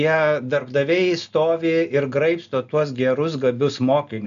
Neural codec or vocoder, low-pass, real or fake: none; 7.2 kHz; real